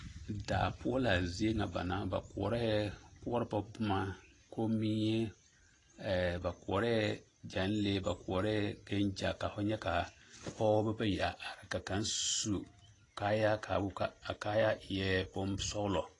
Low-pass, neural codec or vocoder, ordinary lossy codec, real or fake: 10.8 kHz; none; AAC, 32 kbps; real